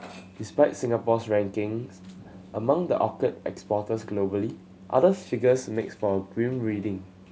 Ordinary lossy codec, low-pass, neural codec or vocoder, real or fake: none; none; none; real